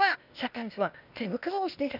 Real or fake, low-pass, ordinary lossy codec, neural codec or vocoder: fake; 5.4 kHz; none; codec, 16 kHz, 0.8 kbps, ZipCodec